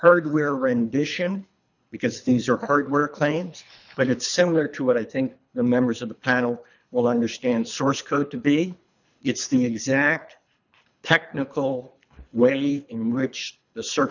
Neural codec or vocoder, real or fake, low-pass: codec, 24 kHz, 3 kbps, HILCodec; fake; 7.2 kHz